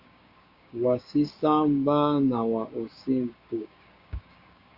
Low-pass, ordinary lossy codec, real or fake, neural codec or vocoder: 5.4 kHz; Opus, 64 kbps; fake; codec, 16 kHz, 6 kbps, DAC